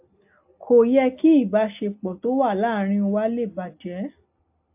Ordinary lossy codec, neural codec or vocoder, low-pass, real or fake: AAC, 32 kbps; none; 3.6 kHz; real